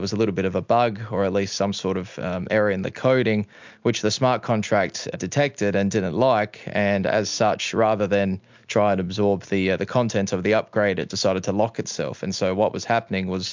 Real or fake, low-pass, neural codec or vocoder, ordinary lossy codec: real; 7.2 kHz; none; MP3, 64 kbps